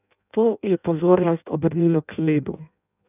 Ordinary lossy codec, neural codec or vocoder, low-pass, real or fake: none; codec, 16 kHz in and 24 kHz out, 0.6 kbps, FireRedTTS-2 codec; 3.6 kHz; fake